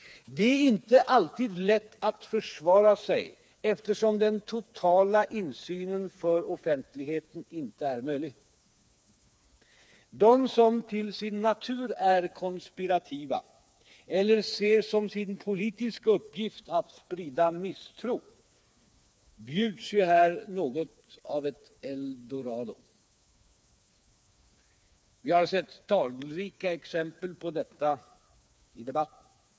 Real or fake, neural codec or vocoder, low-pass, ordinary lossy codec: fake; codec, 16 kHz, 4 kbps, FreqCodec, smaller model; none; none